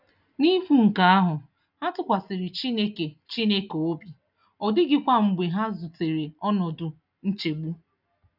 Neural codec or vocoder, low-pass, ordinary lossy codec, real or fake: none; 5.4 kHz; none; real